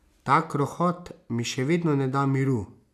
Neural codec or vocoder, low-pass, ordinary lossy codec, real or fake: none; 14.4 kHz; none; real